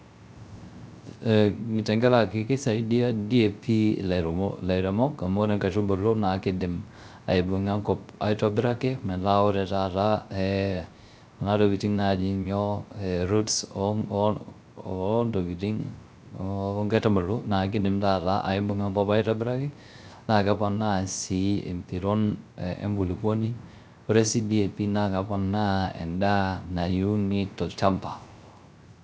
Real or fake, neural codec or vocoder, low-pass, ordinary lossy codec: fake; codec, 16 kHz, 0.3 kbps, FocalCodec; none; none